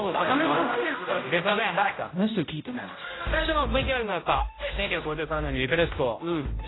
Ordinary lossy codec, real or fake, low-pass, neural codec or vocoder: AAC, 16 kbps; fake; 7.2 kHz; codec, 16 kHz, 0.5 kbps, X-Codec, HuBERT features, trained on general audio